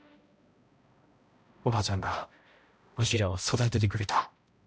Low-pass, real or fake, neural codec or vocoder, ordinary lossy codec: none; fake; codec, 16 kHz, 0.5 kbps, X-Codec, HuBERT features, trained on balanced general audio; none